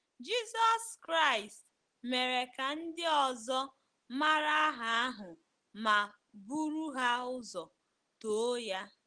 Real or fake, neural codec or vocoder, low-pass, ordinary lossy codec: real; none; 9.9 kHz; Opus, 16 kbps